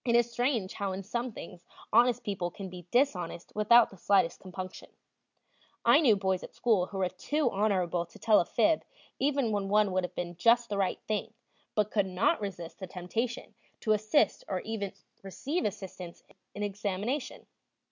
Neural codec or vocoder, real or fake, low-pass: none; real; 7.2 kHz